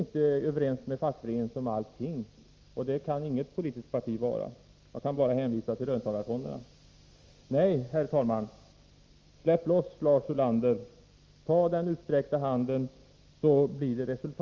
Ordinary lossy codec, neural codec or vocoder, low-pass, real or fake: Opus, 32 kbps; none; 7.2 kHz; real